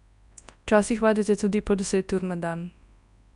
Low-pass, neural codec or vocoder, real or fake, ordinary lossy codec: 10.8 kHz; codec, 24 kHz, 0.9 kbps, WavTokenizer, large speech release; fake; MP3, 96 kbps